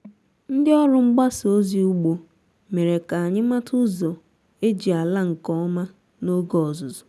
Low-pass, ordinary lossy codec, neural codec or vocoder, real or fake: none; none; none; real